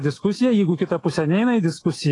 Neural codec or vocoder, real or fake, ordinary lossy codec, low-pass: autoencoder, 48 kHz, 128 numbers a frame, DAC-VAE, trained on Japanese speech; fake; AAC, 32 kbps; 10.8 kHz